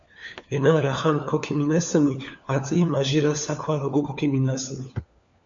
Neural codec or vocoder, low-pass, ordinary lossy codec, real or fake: codec, 16 kHz, 4 kbps, FunCodec, trained on LibriTTS, 50 frames a second; 7.2 kHz; MP3, 64 kbps; fake